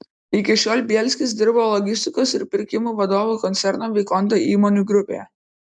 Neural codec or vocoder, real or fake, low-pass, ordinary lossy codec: none; real; 9.9 kHz; MP3, 96 kbps